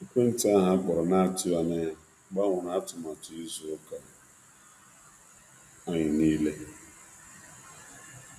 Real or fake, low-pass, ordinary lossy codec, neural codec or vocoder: real; 14.4 kHz; none; none